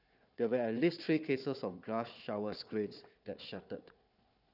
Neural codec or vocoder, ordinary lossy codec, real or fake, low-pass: codec, 16 kHz, 4 kbps, FunCodec, trained on Chinese and English, 50 frames a second; none; fake; 5.4 kHz